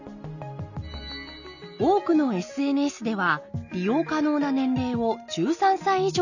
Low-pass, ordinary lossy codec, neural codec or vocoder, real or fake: 7.2 kHz; none; none; real